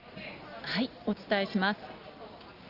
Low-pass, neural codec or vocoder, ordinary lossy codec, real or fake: 5.4 kHz; none; Opus, 32 kbps; real